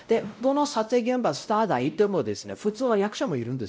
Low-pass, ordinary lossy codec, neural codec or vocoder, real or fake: none; none; codec, 16 kHz, 0.5 kbps, X-Codec, WavLM features, trained on Multilingual LibriSpeech; fake